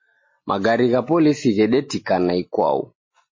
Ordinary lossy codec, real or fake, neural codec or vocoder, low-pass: MP3, 32 kbps; real; none; 7.2 kHz